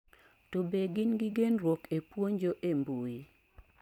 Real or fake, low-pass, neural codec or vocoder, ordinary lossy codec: real; 19.8 kHz; none; none